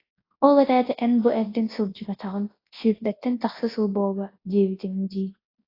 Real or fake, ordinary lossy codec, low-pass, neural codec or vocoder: fake; AAC, 24 kbps; 5.4 kHz; codec, 24 kHz, 0.9 kbps, WavTokenizer, large speech release